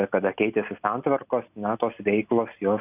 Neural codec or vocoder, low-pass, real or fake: none; 3.6 kHz; real